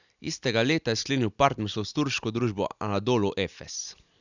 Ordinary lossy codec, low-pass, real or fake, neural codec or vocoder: none; 7.2 kHz; real; none